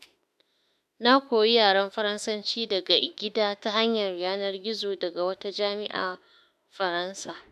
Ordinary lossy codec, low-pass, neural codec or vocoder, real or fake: none; 14.4 kHz; autoencoder, 48 kHz, 32 numbers a frame, DAC-VAE, trained on Japanese speech; fake